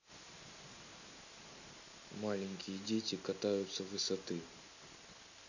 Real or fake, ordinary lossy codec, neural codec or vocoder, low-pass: real; none; none; 7.2 kHz